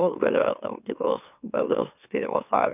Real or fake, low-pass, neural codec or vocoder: fake; 3.6 kHz; autoencoder, 44.1 kHz, a latent of 192 numbers a frame, MeloTTS